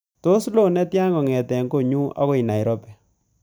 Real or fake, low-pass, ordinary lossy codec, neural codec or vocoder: real; none; none; none